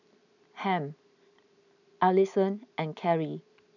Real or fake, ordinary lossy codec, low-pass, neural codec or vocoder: real; none; 7.2 kHz; none